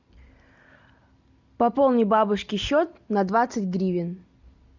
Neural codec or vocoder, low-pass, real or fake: none; 7.2 kHz; real